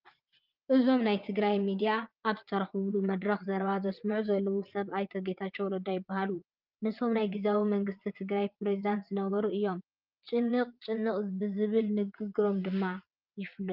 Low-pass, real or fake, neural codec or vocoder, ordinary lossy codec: 5.4 kHz; fake; vocoder, 22.05 kHz, 80 mel bands, WaveNeXt; Opus, 32 kbps